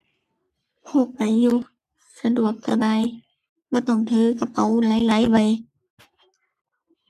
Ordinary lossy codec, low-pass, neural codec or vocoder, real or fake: none; 14.4 kHz; codec, 44.1 kHz, 3.4 kbps, Pupu-Codec; fake